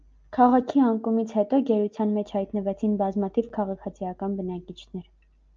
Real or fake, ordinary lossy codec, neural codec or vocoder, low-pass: real; Opus, 24 kbps; none; 7.2 kHz